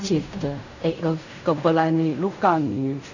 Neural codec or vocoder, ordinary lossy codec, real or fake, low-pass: codec, 16 kHz in and 24 kHz out, 0.4 kbps, LongCat-Audio-Codec, fine tuned four codebook decoder; none; fake; 7.2 kHz